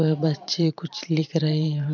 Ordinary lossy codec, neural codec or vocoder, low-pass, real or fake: none; vocoder, 44.1 kHz, 128 mel bands every 512 samples, BigVGAN v2; 7.2 kHz; fake